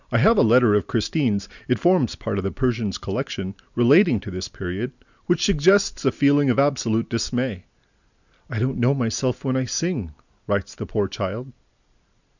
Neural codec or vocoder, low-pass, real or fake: none; 7.2 kHz; real